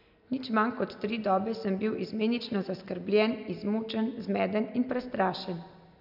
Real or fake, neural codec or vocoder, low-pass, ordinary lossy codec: real; none; 5.4 kHz; none